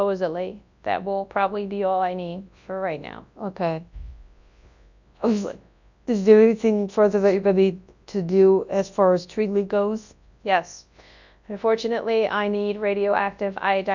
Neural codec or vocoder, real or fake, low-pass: codec, 24 kHz, 0.9 kbps, WavTokenizer, large speech release; fake; 7.2 kHz